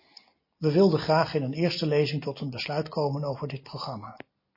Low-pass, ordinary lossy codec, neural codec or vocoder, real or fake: 5.4 kHz; MP3, 24 kbps; none; real